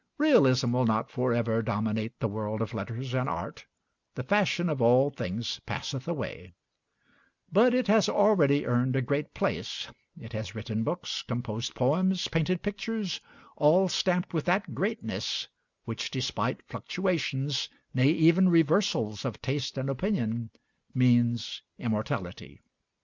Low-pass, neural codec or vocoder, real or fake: 7.2 kHz; none; real